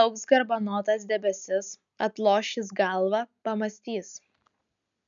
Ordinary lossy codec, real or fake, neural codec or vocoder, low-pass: MP3, 96 kbps; real; none; 7.2 kHz